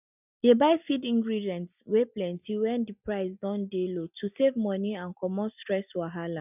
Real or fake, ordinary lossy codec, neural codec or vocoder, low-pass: real; none; none; 3.6 kHz